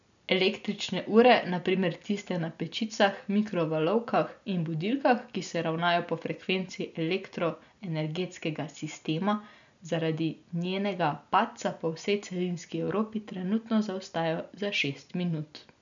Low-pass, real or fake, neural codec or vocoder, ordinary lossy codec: 7.2 kHz; real; none; MP3, 96 kbps